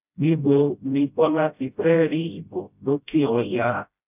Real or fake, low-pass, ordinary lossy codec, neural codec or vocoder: fake; 3.6 kHz; none; codec, 16 kHz, 0.5 kbps, FreqCodec, smaller model